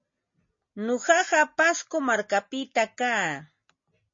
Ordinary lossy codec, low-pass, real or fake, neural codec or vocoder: MP3, 32 kbps; 7.2 kHz; real; none